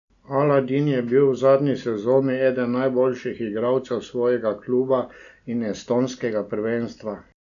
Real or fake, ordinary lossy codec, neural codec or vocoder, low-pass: real; none; none; 7.2 kHz